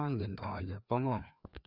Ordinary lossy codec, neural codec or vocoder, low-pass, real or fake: none; codec, 16 kHz, 2 kbps, FreqCodec, larger model; 5.4 kHz; fake